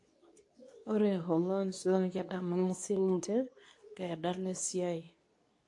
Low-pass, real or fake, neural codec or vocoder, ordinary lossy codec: 10.8 kHz; fake; codec, 24 kHz, 0.9 kbps, WavTokenizer, medium speech release version 2; AAC, 48 kbps